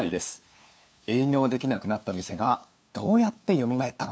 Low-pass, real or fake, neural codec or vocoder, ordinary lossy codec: none; fake; codec, 16 kHz, 2 kbps, FunCodec, trained on LibriTTS, 25 frames a second; none